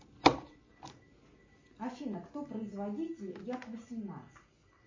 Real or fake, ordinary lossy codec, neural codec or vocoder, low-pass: real; MP3, 32 kbps; none; 7.2 kHz